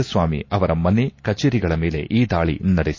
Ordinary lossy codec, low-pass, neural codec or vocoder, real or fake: MP3, 32 kbps; 7.2 kHz; none; real